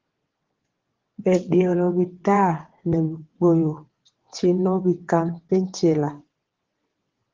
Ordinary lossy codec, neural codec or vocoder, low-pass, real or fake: Opus, 16 kbps; vocoder, 22.05 kHz, 80 mel bands, WaveNeXt; 7.2 kHz; fake